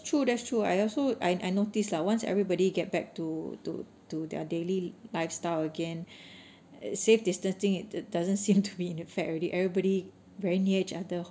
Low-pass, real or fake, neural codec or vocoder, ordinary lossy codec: none; real; none; none